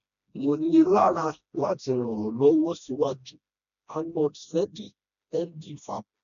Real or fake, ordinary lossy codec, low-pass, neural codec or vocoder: fake; MP3, 96 kbps; 7.2 kHz; codec, 16 kHz, 1 kbps, FreqCodec, smaller model